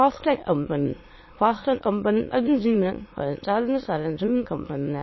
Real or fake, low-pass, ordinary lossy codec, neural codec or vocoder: fake; 7.2 kHz; MP3, 24 kbps; autoencoder, 22.05 kHz, a latent of 192 numbers a frame, VITS, trained on many speakers